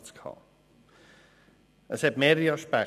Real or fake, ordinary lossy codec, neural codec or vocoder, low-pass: real; none; none; 14.4 kHz